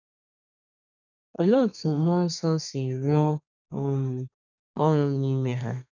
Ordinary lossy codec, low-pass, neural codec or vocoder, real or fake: none; 7.2 kHz; codec, 32 kHz, 1.9 kbps, SNAC; fake